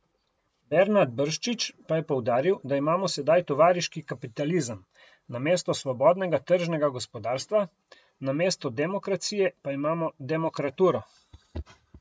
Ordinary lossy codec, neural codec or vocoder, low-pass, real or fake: none; none; none; real